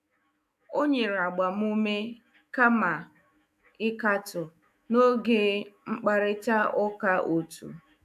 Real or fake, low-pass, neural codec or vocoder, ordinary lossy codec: fake; 14.4 kHz; autoencoder, 48 kHz, 128 numbers a frame, DAC-VAE, trained on Japanese speech; AAC, 96 kbps